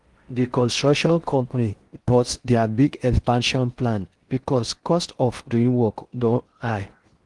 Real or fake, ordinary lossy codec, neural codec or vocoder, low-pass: fake; Opus, 24 kbps; codec, 16 kHz in and 24 kHz out, 0.6 kbps, FocalCodec, streaming, 4096 codes; 10.8 kHz